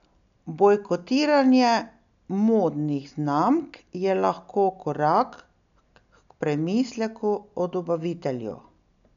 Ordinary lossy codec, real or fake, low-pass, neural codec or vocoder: none; real; 7.2 kHz; none